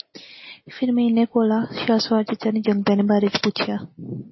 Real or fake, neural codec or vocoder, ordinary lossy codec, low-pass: real; none; MP3, 24 kbps; 7.2 kHz